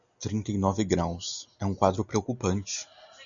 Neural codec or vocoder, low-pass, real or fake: none; 7.2 kHz; real